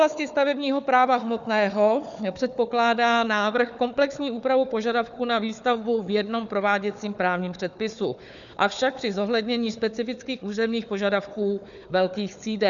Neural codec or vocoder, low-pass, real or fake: codec, 16 kHz, 4 kbps, FunCodec, trained on Chinese and English, 50 frames a second; 7.2 kHz; fake